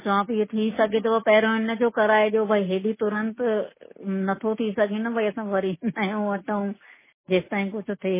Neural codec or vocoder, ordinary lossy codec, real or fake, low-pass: none; MP3, 16 kbps; real; 3.6 kHz